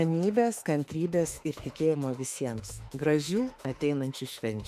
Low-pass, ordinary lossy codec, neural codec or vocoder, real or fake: 14.4 kHz; AAC, 96 kbps; autoencoder, 48 kHz, 32 numbers a frame, DAC-VAE, trained on Japanese speech; fake